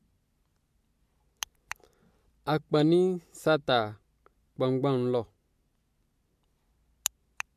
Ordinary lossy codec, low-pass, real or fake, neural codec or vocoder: MP3, 64 kbps; 14.4 kHz; real; none